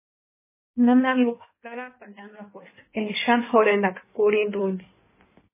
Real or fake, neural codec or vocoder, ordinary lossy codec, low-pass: fake; codec, 16 kHz in and 24 kHz out, 1.1 kbps, FireRedTTS-2 codec; MP3, 16 kbps; 3.6 kHz